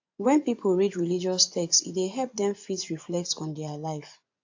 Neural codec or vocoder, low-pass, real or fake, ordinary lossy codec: vocoder, 24 kHz, 100 mel bands, Vocos; 7.2 kHz; fake; AAC, 48 kbps